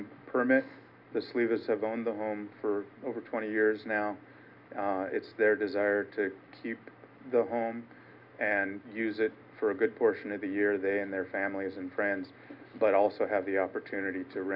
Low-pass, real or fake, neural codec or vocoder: 5.4 kHz; real; none